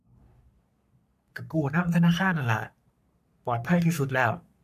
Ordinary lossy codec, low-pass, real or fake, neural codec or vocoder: none; 14.4 kHz; fake; codec, 44.1 kHz, 3.4 kbps, Pupu-Codec